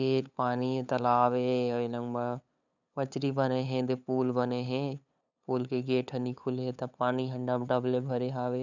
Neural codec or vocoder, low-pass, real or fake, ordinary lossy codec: codec, 16 kHz, 8 kbps, FunCodec, trained on LibriTTS, 25 frames a second; 7.2 kHz; fake; none